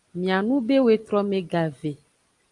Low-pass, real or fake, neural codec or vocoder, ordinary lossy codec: 10.8 kHz; real; none; Opus, 32 kbps